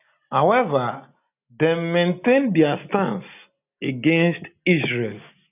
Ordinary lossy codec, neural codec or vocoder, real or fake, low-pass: none; none; real; 3.6 kHz